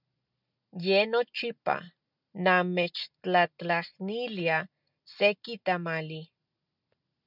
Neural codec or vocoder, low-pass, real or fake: none; 5.4 kHz; real